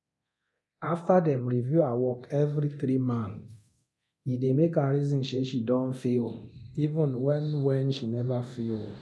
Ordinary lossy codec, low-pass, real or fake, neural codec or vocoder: none; none; fake; codec, 24 kHz, 0.9 kbps, DualCodec